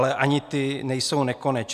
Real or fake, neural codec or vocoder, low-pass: real; none; 14.4 kHz